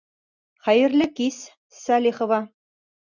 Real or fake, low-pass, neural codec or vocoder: real; 7.2 kHz; none